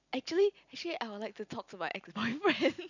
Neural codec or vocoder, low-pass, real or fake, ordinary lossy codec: none; 7.2 kHz; real; none